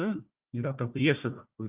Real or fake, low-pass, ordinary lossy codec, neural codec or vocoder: fake; 3.6 kHz; Opus, 24 kbps; codec, 16 kHz, 1 kbps, FunCodec, trained on Chinese and English, 50 frames a second